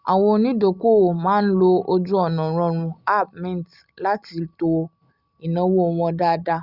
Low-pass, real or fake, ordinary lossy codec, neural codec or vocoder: 5.4 kHz; real; Opus, 64 kbps; none